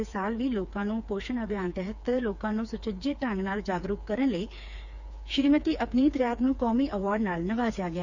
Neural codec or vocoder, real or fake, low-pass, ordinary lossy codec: codec, 16 kHz, 4 kbps, FreqCodec, smaller model; fake; 7.2 kHz; none